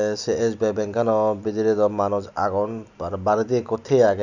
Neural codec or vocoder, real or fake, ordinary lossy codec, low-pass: none; real; none; 7.2 kHz